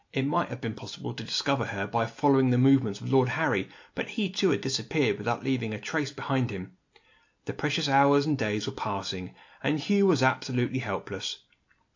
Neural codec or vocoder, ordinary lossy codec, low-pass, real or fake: none; AAC, 48 kbps; 7.2 kHz; real